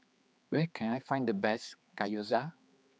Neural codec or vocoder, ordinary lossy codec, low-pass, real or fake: codec, 16 kHz, 4 kbps, X-Codec, HuBERT features, trained on general audio; none; none; fake